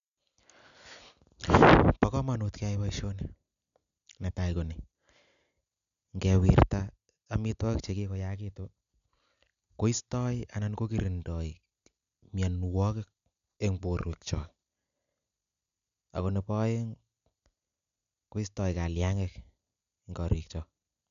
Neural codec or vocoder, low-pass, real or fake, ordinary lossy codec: none; 7.2 kHz; real; none